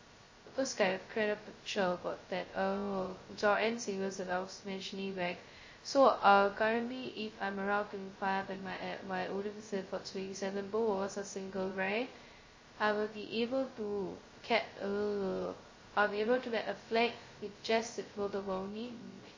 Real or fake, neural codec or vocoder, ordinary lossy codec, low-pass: fake; codec, 16 kHz, 0.2 kbps, FocalCodec; MP3, 32 kbps; 7.2 kHz